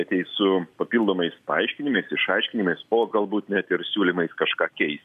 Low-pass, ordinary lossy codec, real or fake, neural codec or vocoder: 14.4 kHz; MP3, 96 kbps; real; none